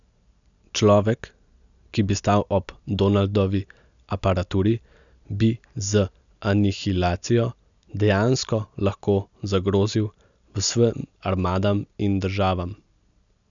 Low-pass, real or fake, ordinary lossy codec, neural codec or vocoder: 7.2 kHz; real; none; none